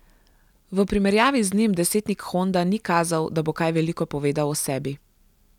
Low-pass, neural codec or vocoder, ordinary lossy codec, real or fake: 19.8 kHz; none; none; real